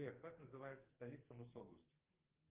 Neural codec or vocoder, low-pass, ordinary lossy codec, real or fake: codec, 44.1 kHz, 2.6 kbps, SNAC; 3.6 kHz; Opus, 24 kbps; fake